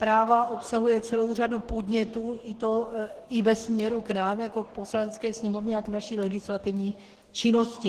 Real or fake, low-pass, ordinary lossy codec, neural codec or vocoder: fake; 14.4 kHz; Opus, 16 kbps; codec, 44.1 kHz, 2.6 kbps, DAC